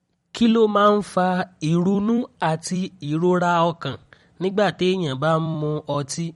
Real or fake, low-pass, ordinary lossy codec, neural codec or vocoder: fake; 19.8 kHz; MP3, 48 kbps; vocoder, 44.1 kHz, 128 mel bands every 256 samples, BigVGAN v2